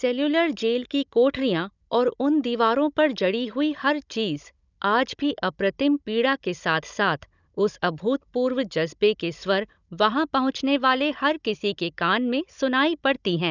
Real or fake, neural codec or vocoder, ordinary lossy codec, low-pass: fake; codec, 16 kHz, 16 kbps, FunCodec, trained on Chinese and English, 50 frames a second; none; 7.2 kHz